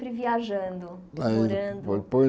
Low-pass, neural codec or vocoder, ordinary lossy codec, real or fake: none; none; none; real